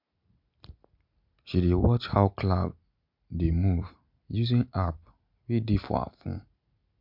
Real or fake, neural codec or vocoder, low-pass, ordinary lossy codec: real; none; 5.4 kHz; MP3, 48 kbps